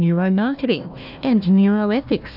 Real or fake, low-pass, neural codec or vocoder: fake; 5.4 kHz; codec, 16 kHz, 1 kbps, FunCodec, trained on Chinese and English, 50 frames a second